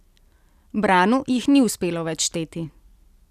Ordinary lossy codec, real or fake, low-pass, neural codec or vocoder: none; real; 14.4 kHz; none